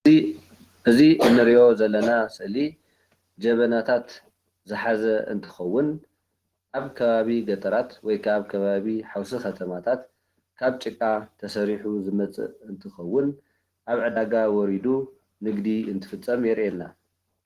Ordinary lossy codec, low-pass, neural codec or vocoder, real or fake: Opus, 16 kbps; 14.4 kHz; none; real